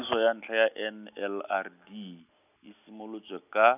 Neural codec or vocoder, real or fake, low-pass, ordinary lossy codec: none; real; 3.6 kHz; none